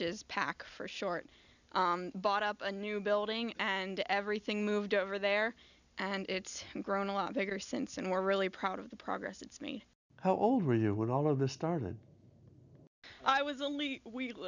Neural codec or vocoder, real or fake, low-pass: none; real; 7.2 kHz